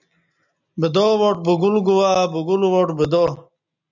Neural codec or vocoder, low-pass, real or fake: none; 7.2 kHz; real